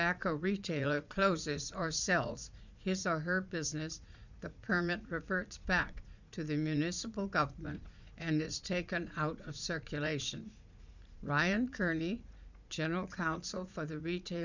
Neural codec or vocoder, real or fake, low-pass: vocoder, 44.1 kHz, 80 mel bands, Vocos; fake; 7.2 kHz